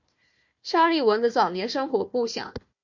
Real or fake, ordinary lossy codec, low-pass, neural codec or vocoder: fake; MP3, 48 kbps; 7.2 kHz; codec, 16 kHz, 1 kbps, FunCodec, trained on Chinese and English, 50 frames a second